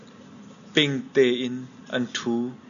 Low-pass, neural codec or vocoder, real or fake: 7.2 kHz; none; real